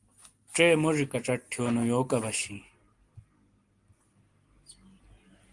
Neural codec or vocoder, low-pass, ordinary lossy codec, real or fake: none; 10.8 kHz; Opus, 24 kbps; real